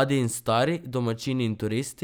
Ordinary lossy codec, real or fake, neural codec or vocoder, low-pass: none; real; none; none